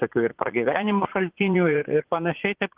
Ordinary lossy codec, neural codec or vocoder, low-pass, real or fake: Opus, 16 kbps; vocoder, 44.1 kHz, 80 mel bands, Vocos; 3.6 kHz; fake